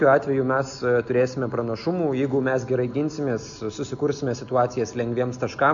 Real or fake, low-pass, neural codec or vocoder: real; 7.2 kHz; none